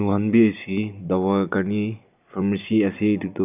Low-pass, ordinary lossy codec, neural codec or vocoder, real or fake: 3.6 kHz; none; none; real